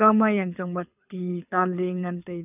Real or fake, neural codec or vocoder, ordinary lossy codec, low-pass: fake; codec, 24 kHz, 6 kbps, HILCodec; none; 3.6 kHz